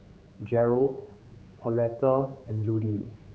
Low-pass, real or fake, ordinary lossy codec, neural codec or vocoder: none; fake; none; codec, 16 kHz, 4 kbps, X-Codec, HuBERT features, trained on general audio